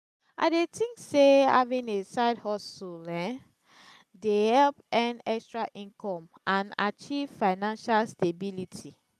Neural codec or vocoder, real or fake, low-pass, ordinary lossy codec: none; real; 14.4 kHz; none